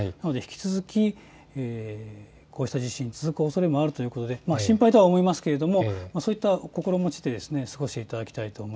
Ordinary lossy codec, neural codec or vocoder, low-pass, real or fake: none; none; none; real